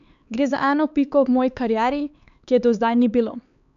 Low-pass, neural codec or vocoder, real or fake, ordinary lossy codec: 7.2 kHz; codec, 16 kHz, 4 kbps, X-Codec, HuBERT features, trained on LibriSpeech; fake; none